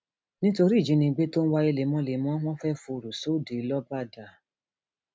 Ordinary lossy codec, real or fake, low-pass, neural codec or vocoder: none; real; none; none